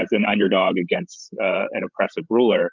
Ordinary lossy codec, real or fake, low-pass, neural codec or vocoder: Opus, 24 kbps; fake; 7.2 kHz; codec, 16 kHz, 8 kbps, FreqCodec, larger model